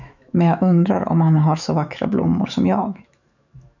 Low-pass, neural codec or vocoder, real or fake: 7.2 kHz; autoencoder, 48 kHz, 128 numbers a frame, DAC-VAE, trained on Japanese speech; fake